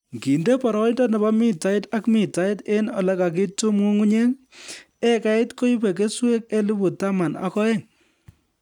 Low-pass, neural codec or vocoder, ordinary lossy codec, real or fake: 19.8 kHz; none; none; real